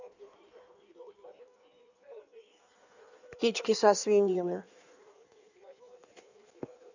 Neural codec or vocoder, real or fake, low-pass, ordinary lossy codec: codec, 16 kHz in and 24 kHz out, 1.1 kbps, FireRedTTS-2 codec; fake; 7.2 kHz; none